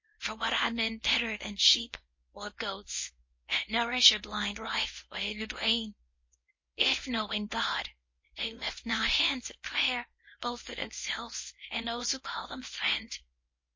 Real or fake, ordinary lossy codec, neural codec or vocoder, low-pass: fake; MP3, 32 kbps; codec, 24 kHz, 0.9 kbps, WavTokenizer, medium speech release version 1; 7.2 kHz